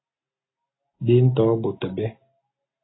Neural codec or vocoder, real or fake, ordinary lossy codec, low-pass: none; real; AAC, 16 kbps; 7.2 kHz